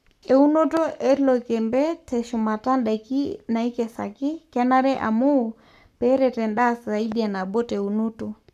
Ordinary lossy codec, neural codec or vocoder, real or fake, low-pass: none; codec, 44.1 kHz, 7.8 kbps, Pupu-Codec; fake; 14.4 kHz